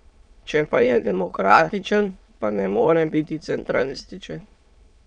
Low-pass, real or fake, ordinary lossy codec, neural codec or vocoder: 9.9 kHz; fake; none; autoencoder, 22.05 kHz, a latent of 192 numbers a frame, VITS, trained on many speakers